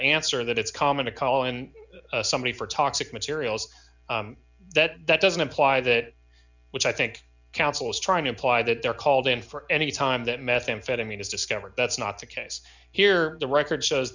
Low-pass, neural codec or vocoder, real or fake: 7.2 kHz; none; real